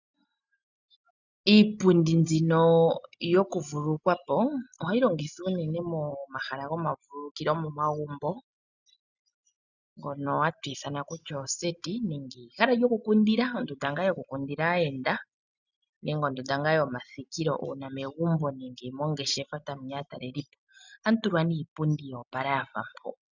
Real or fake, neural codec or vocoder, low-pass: real; none; 7.2 kHz